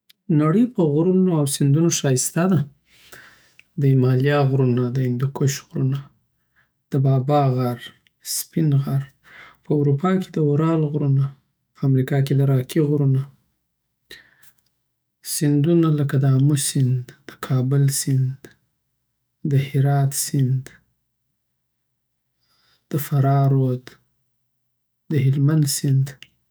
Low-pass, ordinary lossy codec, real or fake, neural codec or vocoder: none; none; fake; autoencoder, 48 kHz, 128 numbers a frame, DAC-VAE, trained on Japanese speech